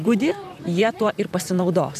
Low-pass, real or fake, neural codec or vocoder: 14.4 kHz; fake; vocoder, 44.1 kHz, 128 mel bands, Pupu-Vocoder